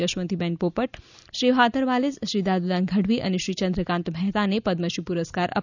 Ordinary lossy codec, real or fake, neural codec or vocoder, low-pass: none; real; none; 7.2 kHz